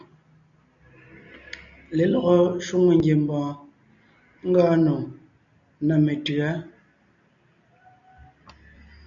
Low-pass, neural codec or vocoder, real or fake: 7.2 kHz; none; real